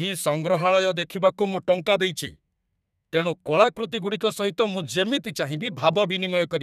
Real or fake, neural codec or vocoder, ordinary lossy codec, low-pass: fake; codec, 32 kHz, 1.9 kbps, SNAC; none; 14.4 kHz